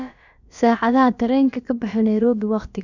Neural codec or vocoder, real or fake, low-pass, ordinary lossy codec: codec, 16 kHz, about 1 kbps, DyCAST, with the encoder's durations; fake; 7.2 kHz; none